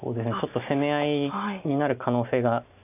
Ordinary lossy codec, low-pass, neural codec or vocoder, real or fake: none; 3.6 kHz; none; real